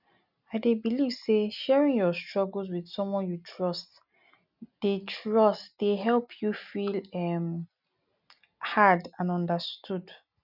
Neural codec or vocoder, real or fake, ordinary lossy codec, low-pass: none; real; none; 5.4 kHz